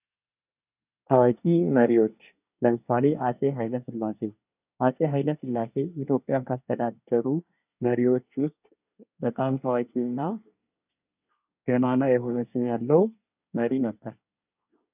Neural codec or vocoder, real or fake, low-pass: codec, 24 kHz, 1 kbps, SNAC; fake; 3.6 kHz